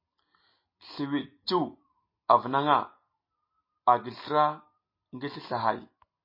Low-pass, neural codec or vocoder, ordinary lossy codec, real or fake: 5.4 kHz; none; MP3, 24 kbps; real